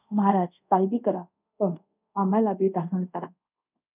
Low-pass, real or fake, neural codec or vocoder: 3.6 kHz; fake; codec, 24 kHz, 0.5 kbps, DualCodec